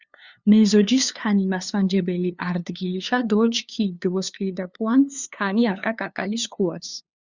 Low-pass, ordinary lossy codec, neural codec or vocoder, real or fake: 7.2 kHz; Opus, 64 kbps; codec, 16 kHz, 2 kbps, FunCodec, trained on LibriTTS, 25 frames a second; fake